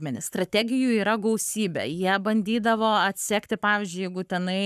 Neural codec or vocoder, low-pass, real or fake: codec, 44.1 kHz, 7.8 kbps, Pupu-Codec; 14.4 kHz; fake